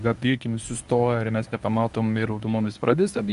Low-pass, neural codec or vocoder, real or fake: 10.8 kHz; codec, 24 kHz, 0.9 kbps, WavTokenizer, medium speech release version 1; fake